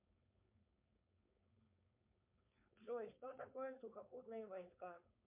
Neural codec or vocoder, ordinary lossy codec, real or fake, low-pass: codec, 16 kHz, 4.8 kbps, FACodec; MP3, 32 kbps; fake; 3.6 kHz